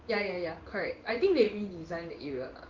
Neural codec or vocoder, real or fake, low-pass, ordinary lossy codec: codec, 16 kHz in and 24 kHz out, 1 kbps, XY-Tokenizer; fake; 7.2 kHz; Opus, 32 kbps